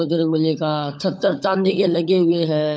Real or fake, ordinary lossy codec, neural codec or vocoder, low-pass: fake; none; codec, 16 kHz, 16 kbps, FunCodec, trained on LibriTTS, 50 frames a second; none